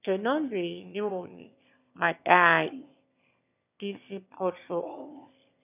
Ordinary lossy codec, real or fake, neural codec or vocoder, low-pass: none; fake; autoencoder, 22.05 kHz, a latent of 192 numbers a frame, VITS, trained on one speaker; 3.6 kHz